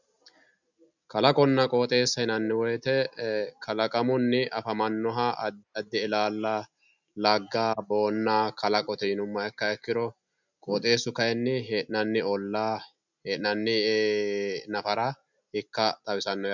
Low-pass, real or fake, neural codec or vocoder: 7.2 kHz; real; none